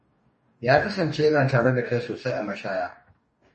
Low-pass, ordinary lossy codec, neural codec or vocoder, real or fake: 10.8 kHz; MP3, 32 kbps; codec, 44.1 kHz, 2.6 kbps, DAC; fake